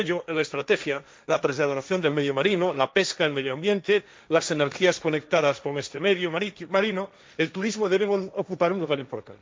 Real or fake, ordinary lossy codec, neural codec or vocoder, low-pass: fake; none; codec, 16 kHz, 1.1 kbps, Voila-Tokenizer; none